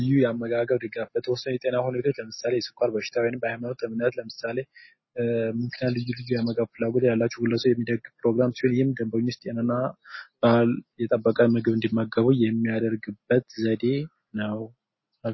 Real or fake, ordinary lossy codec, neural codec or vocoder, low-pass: real; MP3, 24 kbps; none; 7.2 kHz